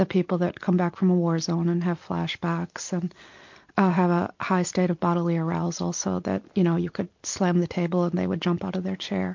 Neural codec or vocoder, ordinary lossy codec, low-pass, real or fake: none; MP3, 48 kbps; 7.2 kHz; real